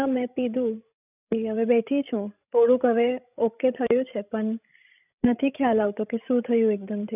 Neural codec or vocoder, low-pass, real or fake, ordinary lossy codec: codec, 16 kHz, 16 kbps, FreqCodec, larger model; 3.6 kHz; fake; none